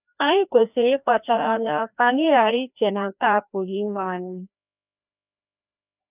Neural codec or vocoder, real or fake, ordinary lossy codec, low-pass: codec, 16 kHz, 1 kbps, FreqCodec, larger model; fake; none; 3.6 kHz